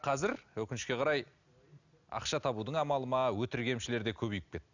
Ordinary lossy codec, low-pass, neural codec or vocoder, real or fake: none; 7.2 kHz; none; real